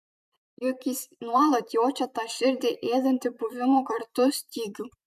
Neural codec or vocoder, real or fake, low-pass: vocoder, 44.1 kHz, 128 mel bands every 256 samples, BigVGAN v2; fake; 14.4 kHz